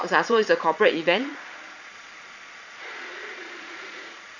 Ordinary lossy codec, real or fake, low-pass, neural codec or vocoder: none; fake; 7.2 kHz; vocoder, 22.05 kHz, 80 mel bands, Vocos